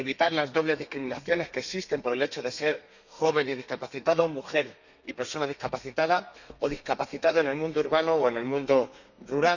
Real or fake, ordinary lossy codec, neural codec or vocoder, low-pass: fake; none; codec, 32 kHz, 1.9 kbps, SNAC; 7.2 kHz